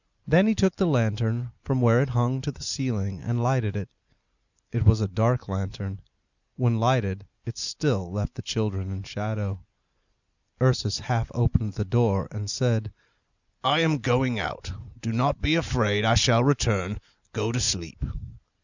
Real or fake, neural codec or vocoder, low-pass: real; none; 7.2 kHz